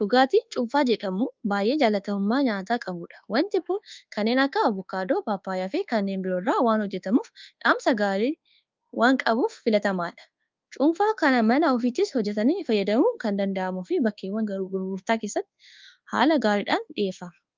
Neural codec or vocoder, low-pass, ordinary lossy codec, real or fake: codec, 24 kHz, 1.2 kbps, DualCodec; 7.2 kHz; Opus, 32 kbps; fake